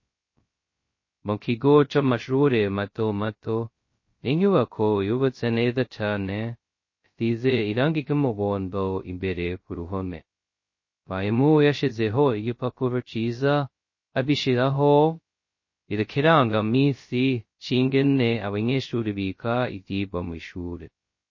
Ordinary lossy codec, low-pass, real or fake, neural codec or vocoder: MP3, 32 kbps; 7.2 kHz; fake; codec, 16 kHz, 0.2 kbps, FocalCodec